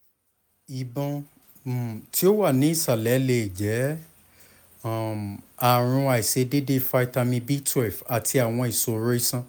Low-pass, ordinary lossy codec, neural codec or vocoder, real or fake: none; none; none; real